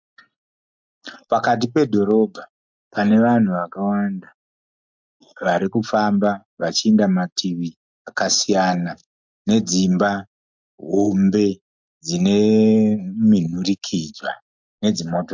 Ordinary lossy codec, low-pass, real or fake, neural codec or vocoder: MP3, 64 kbps; 7.2 kHz; real; none